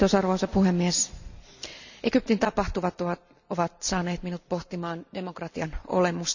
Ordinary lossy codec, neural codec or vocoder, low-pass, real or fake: none; none; 7.2 kHz; real